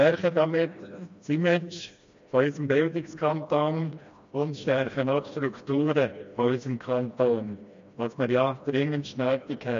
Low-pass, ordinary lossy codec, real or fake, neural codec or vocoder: 7.2 kHz; MP3, 48 kbps; fake; codec, 16 kHz, 1 kbps, FreqCodec, smaller model